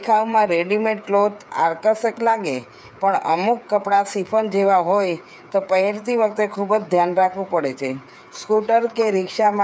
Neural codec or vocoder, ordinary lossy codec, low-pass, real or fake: codec, 16 kHz, 8 kbps, FreqCodec, smaller model; none; none; fake